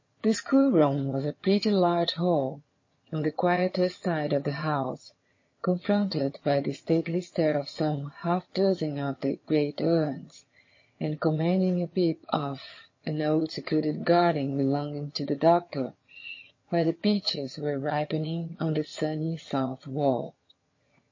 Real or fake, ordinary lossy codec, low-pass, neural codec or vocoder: fake; MP3, 32 kbps; 7.2 kHz; vocoder, 22.05 kHz, 80 mel bands, HiFi-GAN